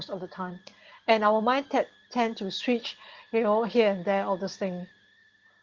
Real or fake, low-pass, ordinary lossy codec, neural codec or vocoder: real; 7.2 kHz; Opus, 16 kbps; none